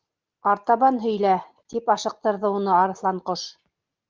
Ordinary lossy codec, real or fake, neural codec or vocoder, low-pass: Opus, 24 kbps; real; none; 7.2 kHz